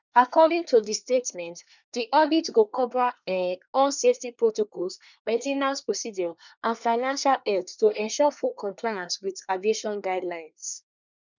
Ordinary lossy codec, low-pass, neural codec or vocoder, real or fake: none; 7.2 kHz; codec, 24 kHz, 1 kbps, SNAC; fake